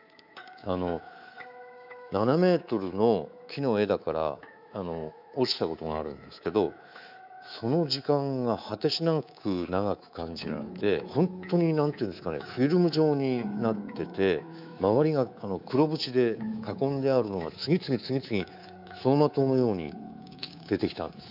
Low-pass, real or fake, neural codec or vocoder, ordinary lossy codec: 5.4 kHz; fake; codec, 24 kHz, 3.1 kbps, DualCodec; none